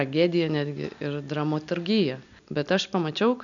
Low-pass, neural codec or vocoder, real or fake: 7.2 kHz; none; real